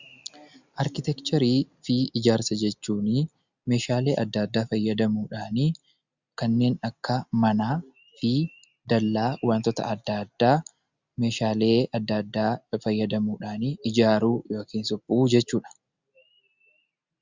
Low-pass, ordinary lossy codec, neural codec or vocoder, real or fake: 7.2 kHz; Opus, 64 kbps; none; real